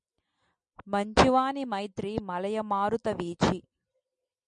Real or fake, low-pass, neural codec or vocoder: real; 9.9 kHz; none